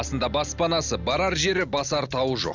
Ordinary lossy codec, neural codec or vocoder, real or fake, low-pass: none; none; real; 7.2 kHz